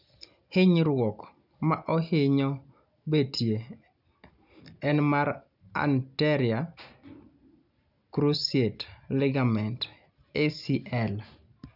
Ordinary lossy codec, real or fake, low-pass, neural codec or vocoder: none; real; 5.4 kHz; none